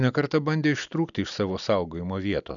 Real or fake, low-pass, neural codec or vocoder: real; 7.2 kHz; none